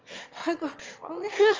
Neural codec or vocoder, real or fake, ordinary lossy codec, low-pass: autoencoder, 22.05 kHz, a latent of 192 numbers a frame, VITS, trained on one speaker; fake; Opus, 24 kbps; 7.2 kHz